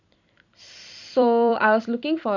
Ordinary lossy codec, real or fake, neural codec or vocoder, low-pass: none; fake; vocoder, 44.1 kHz, 128 mel bands every 256 samples, BigVGAN v2; 7.2 kHz